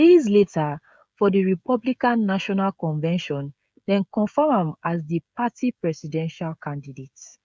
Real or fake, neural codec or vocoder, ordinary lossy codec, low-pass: fake; codec, 16 kHz, 16 kbps, FreqCodec, smaller model; none; none